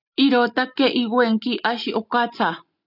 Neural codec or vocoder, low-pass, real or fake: none; 5.4 kHz; real